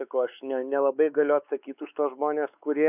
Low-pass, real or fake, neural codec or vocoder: 3.6 kHz; fake; codec, 16 kHz, 4 kbps, X-Codec, WavLM features, trained on Multilingual LibriSpeech